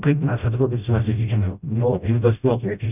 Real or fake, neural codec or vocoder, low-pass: fake; codec, 16 kHz, 0.5 kbps, FreqCodec, smaller model; 3.6 kHz